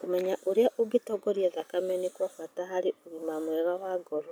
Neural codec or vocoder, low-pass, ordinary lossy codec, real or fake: none; none; none; real